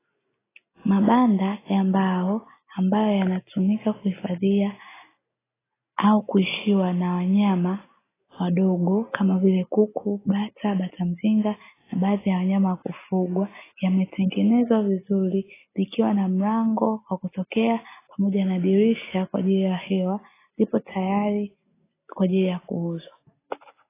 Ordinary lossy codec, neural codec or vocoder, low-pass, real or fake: AAC, 16 kbps; none; 3.6 kHz; real